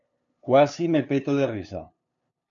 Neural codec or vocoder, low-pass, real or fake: codec, 16 kHz, 2 kbps, FunCodec, trained on LibriTTS, 25 frames a second; 7.2 kHz; fake